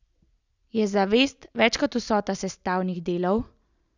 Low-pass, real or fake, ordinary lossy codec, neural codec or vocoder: 7.2 kHz; real; none; none